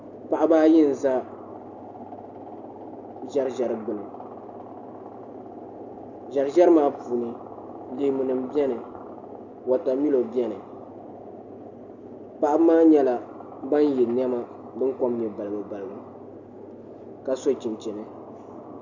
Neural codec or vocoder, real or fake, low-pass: none; real; 7.2 kHz